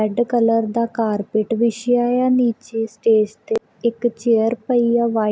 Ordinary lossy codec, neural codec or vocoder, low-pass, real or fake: none; none; none; real